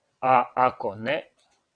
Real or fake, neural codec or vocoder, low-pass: fake; vocoder, 22.05 kHz, 80 mel bands, WaveNeXt; 9.9 kHz